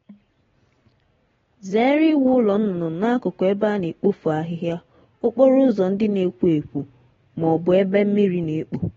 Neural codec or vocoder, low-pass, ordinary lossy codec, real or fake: none; 7.2 kHz; AAC, 24 kbps; real